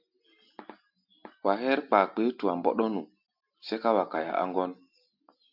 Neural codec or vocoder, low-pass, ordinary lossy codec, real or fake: none; 5.4 kHz; MP3, 48 kbps; real